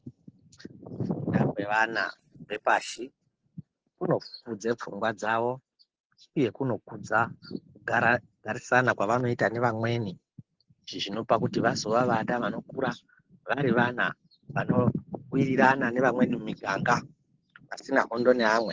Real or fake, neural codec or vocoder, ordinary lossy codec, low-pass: real; none; Opus, 16 kbps; 7.2 kHz